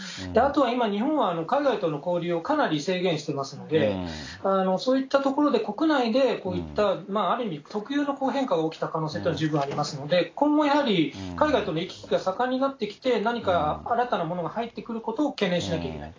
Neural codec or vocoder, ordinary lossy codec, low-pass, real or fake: none; AAC, 32 kbps; 7.2 kHz; real